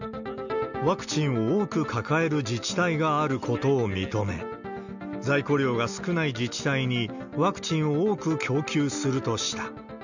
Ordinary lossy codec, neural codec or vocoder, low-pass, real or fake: none; none; 7.2 kHz; real